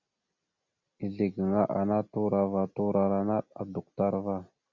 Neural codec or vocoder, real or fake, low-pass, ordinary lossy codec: none; real; 7.2 kHz; Opus, 64 kbps